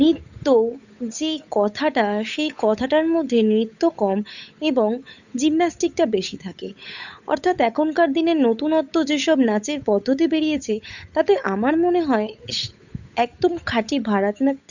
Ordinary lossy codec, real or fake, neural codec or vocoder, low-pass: none; fake; codec, 16 kHz, 8 kbps, FunCodec, trained on Chinese and English, 25 frames a second; 7.2 kHz